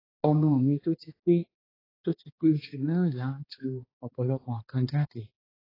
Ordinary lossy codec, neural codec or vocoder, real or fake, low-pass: AAC, 24 kbps; codec, 16 kHz, 2 kbps, X-Codec, HuBERT features, trained on balanced general audio; fake; 5.4 kHz